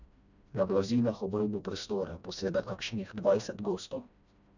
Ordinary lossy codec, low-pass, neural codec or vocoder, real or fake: none; 7.2 kHz; codec, 16 kHz, 1 kbps, FreqCodec, smaller model; fake